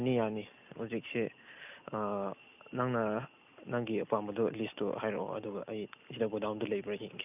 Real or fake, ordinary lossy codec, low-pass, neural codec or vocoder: real; none; 3.6 kHz; none